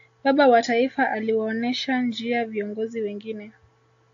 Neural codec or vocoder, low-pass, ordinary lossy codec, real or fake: none; 7.2 kHz; AAC, 48 kbps; real